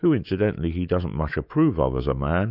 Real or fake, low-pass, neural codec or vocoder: fake; 5.4 kHz; autoencoder, 48 kHz, 128 numbers a frame, DAC-VAE, trained on Japanese speech